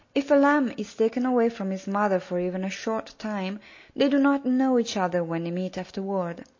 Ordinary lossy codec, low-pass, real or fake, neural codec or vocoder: MP3, 32 kbps; 7.2 kHz; real; none